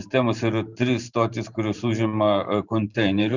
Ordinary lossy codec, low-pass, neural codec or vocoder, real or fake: Opus, 64 kbps; 7.2 kHz; vocoder, 44.1 kHz, 128 mel bands every 512 samples, BigVGAN v2; fake